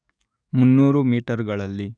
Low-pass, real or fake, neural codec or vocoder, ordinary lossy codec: 9.9 kHz; fake; codec, 44.1 kHz, 7.8 kbps, DAC; none